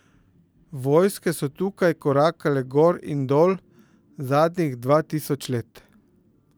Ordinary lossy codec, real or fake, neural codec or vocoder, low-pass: none; fake; vocoder, 44.1 kHz, 128 mel bands every 512 samples, BigVGAN v2; none